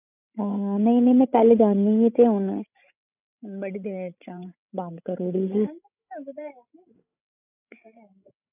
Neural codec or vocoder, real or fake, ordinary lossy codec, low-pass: codec, 16 kHz, 16 kbps, FreqCodec, larger model; fake; none; 3.6 kHz